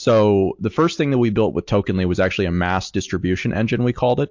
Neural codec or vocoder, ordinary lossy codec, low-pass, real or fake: none; MP3, 48 kbps; 7.2 kHz; real